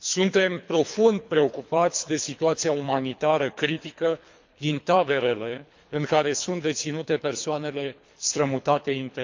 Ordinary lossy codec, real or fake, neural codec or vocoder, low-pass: MP3, 64 kbps; fake; codec, 24 kHz, 3 kbps, HILCodec; 7.2 kHz